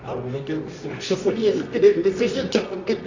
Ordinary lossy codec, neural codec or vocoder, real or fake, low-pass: AAC, 48 kbps; codec, 24 kHz, 0.9 kbps, WavTokenizer, medium music audio release; fake; 7.2 kHz